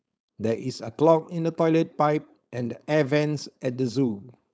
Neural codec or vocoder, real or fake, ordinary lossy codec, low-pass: codec, 16 kHz, 4.8 kbps, FACodec; fake; none; none